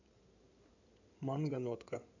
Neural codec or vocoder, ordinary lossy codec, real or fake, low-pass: codec, 16 kHz in and 24 kHz out, 2.2 kbps, FireRedTTS-2 codec; none; fake; 7.2 kHz